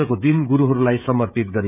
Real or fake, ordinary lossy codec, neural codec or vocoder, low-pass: fake; none; codec, 16 kHz, 16 kbps, FreqCodec, smaller model; 3.6 kHz